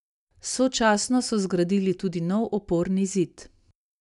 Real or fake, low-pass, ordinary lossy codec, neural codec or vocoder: real; 10.8 kHz; none; none